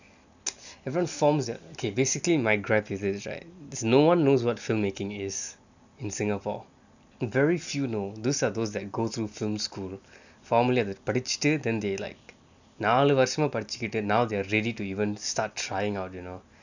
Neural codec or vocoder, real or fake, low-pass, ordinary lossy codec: none; real; 7.2 kHz; none